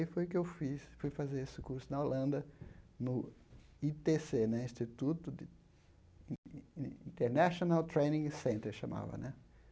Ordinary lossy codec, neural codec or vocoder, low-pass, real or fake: none; none; none; real